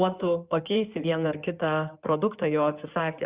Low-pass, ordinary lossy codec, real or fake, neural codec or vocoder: 3.6 kHz; Opus, 64 kbps; fake; codec, 16 kHz in and 24 kHz out, 2.2 kbps, FireRedTTS-2 codec